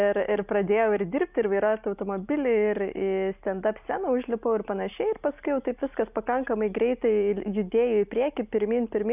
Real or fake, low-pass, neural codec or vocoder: real; 3.6 kHz; none